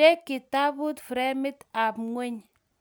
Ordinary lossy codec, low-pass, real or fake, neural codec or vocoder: none; none; real; none